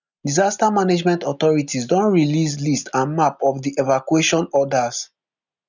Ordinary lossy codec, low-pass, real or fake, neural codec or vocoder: none; 7.2 kHz; real; none